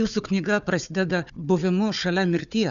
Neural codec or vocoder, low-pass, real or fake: codec, 16 kHz, 4 kbps, FunCodec, trained on Chinese and English, 50 frames a second; 7.2 kHz; fake